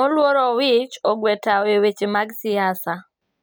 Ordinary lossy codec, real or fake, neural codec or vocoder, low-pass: none; real; none; none